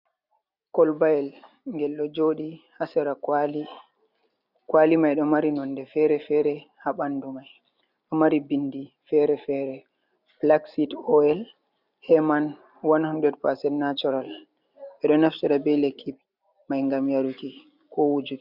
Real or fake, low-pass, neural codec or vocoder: real; 5.4 kHz; none